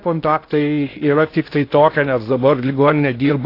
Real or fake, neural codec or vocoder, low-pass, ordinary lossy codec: fake; codec, 16 kHz in and 24 kHz out, 0.6 kbps, FocalCodec, streaming, 2048 codes; 5.4 kHz; AAC, 32 kbps